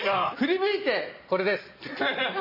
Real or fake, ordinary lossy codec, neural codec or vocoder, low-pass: real; MP3, 24 kbps; none; 5.4 kHz